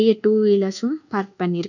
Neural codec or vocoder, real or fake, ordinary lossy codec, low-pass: codec, 24 kHz, 1.2 kbps, DualCodec; fake; none; 7.2 kHz